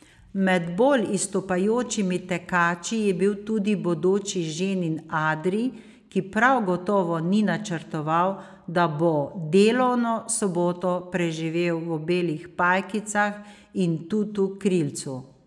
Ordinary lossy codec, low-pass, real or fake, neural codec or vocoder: none; none; real; none